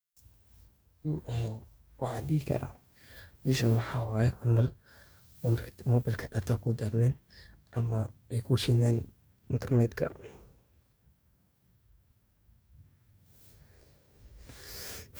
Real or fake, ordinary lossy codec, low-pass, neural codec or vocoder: fake; none; none; codec, 44.1 kHz, 2.6 kbps, DAC